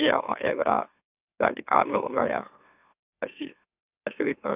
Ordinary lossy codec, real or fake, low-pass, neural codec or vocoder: none; fake; 3.6 kHz; autoencoder, 44.1 kHz, a latent of 192 numbers a frame, MeloTTS